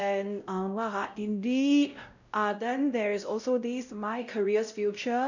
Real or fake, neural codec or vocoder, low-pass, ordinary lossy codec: fake; codec, 16 kHz, 0.5 kbps, X-Codec, WavLM features, trained on Multilingual LibriSpeech; 7.2 kHz; none